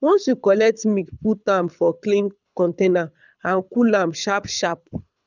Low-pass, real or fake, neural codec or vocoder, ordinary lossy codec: 7.2 kHz; fake; codec, 24 kHz, 6 kbps, HILCodec; none